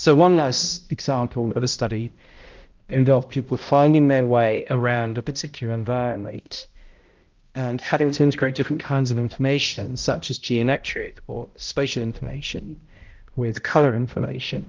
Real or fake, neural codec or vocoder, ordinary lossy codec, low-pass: fake; codec, 16 kHz, 0.5 kbps, X-Codec, HuBERT features, trained on balanced general audio; Opus, 32 kbps; 7.2 kHz